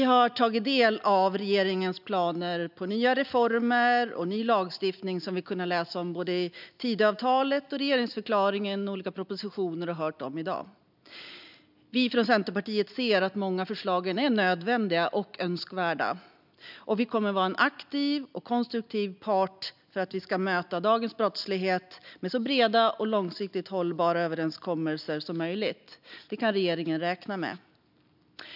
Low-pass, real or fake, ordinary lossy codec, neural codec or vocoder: 5.4 kHz; real; none; none